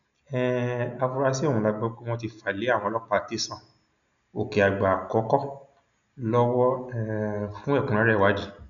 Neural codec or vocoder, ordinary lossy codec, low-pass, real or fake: none; none; 7.2 kHz; real